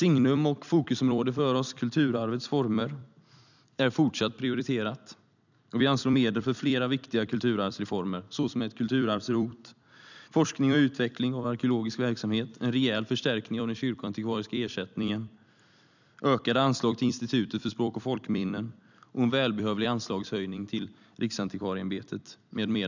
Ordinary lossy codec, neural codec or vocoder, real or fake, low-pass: none; vocoder, 44.1 kHz, 128 mel bands every 256 samples, BigVGAN v2; fake; 7.2 kHz